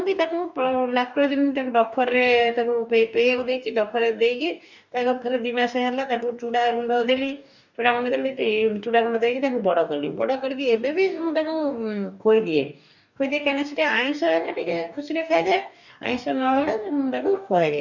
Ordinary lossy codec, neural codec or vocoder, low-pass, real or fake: none; codec, 44.1 kHz, 2.6 kbps, DAC; 7.2 kHz; fake